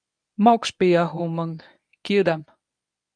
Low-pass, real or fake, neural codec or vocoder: 9.9 kHz; fake; codec, 24 kHz, 0.9 kbps, WavTokenizer, medium speech release version 1